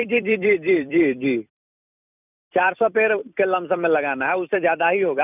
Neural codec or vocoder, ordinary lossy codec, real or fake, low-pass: vocoder, 44.1 kHz, 128 mel bands every 256 samples, BigVGAN v2; none; fake; 3.6 kHz